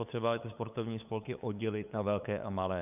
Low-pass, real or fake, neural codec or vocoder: 3.6 kHz; fake; codec, 16 kHz, 8 kbps, FunCodec, trained on LibriTTS, 25 frames a second